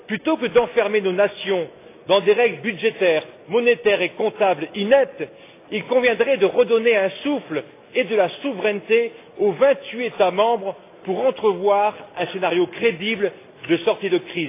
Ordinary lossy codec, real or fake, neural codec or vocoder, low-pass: AAC, 24 kbps; real; none; 3.6 kHz